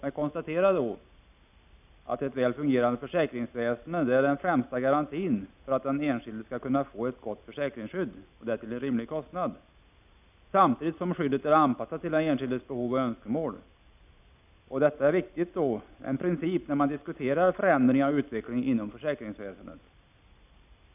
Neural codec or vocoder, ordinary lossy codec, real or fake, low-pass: none; none; real; 3.6 kHz